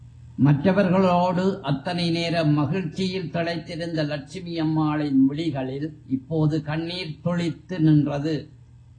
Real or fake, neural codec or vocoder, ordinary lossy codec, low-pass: real; none; AAC, 32 kbps; 9.9 kHz